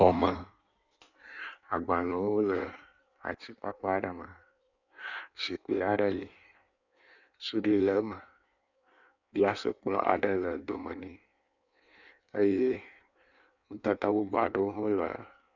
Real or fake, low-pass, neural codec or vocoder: fake; 7.2 kHz; codec, 16 kHz in and 24 kHz out, 1.1 kbps, FireRedTTS-2 codec